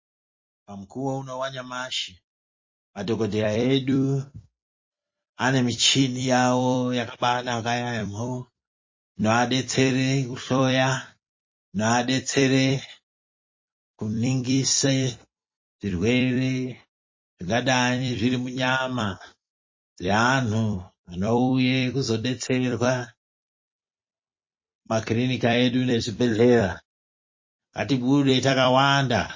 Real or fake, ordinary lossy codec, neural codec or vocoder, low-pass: fake; MP3, 32 kbps; vocoder, 44.1 kHz, 128 mel bands every 256 samples, BigVGAN v2; 7.2 kHz